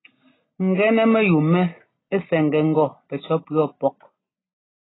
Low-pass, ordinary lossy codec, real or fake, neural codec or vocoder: 7.2 kHz; AAC, 16 kbps; real; none